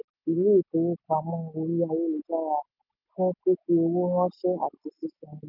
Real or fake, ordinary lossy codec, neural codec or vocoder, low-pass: real; none; none; 5.4 kHz